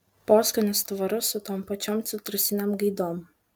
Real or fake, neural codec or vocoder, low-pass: real; none; 19.8 kHz